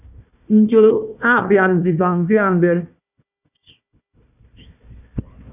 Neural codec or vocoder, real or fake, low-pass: codec, 16 kHz, 1 kbps, FunCodec, trained on Chinese and English, 50 frames a second; fake; 3.6 kHz